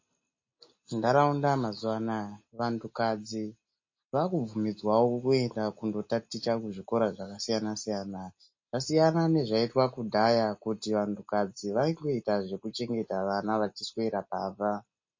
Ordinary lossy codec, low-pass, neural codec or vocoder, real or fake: MP3, 32 kbps; 7.2 kHz; none; real